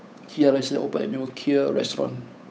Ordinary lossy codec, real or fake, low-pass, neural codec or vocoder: none; fake; none; codec, 16 kHz, 8 kbps, FunCodec, trained on Chinese and English, 25 frames a second